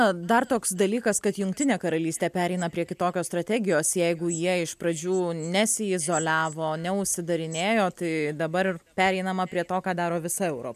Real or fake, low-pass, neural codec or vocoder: real; 14.4 kHz; none